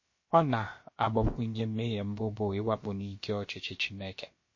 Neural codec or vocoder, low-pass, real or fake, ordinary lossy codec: codec, 16 kHz, about 1 kbps, DyCAST, with the encoder's durations; 7.2 kHz; fake; MP3, 32 kbps